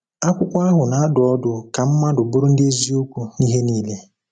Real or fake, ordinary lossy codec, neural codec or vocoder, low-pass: real; none; none; 9.9 kHz